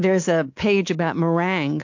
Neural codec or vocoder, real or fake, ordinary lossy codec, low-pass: codec, 24 kHz, 3.1 kbps, DualCodec; fake; AAC, 48 kbps; 7.2 kHz